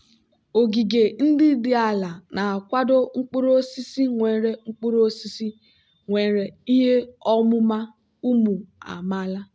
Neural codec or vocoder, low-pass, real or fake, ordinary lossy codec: none; none; real; none